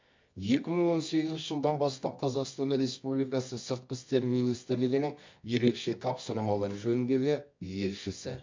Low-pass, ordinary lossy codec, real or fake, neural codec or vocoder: 7.2 kHz; MP3, 48 kbps; fake; codec, 24 kHz, 0.9 kbps, WavTokenizer, medium music audio release